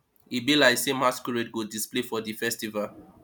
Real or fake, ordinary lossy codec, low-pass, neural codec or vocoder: real; none; none; none